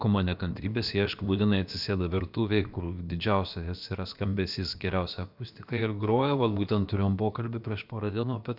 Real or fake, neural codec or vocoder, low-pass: fake; codec, 16 kHz, about 1 kbps, DyCAST, with the encoder's durations; 5.4 kHz